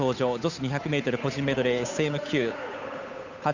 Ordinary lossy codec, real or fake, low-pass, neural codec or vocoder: none; fake; 7.2 kHz; codec, 16 kHz, 8 kbps, FunCodec, trained on Chinese and English, 25 frames a second